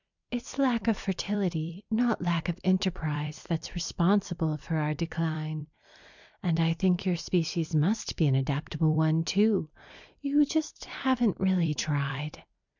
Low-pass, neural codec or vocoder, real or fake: 7.2 kHz; vocoder, 44.1 kHz, 128 mel bands every 256 samples, BigVGAN v2; fake